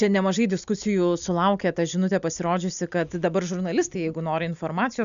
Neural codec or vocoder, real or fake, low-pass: none; real; 7.2 kHz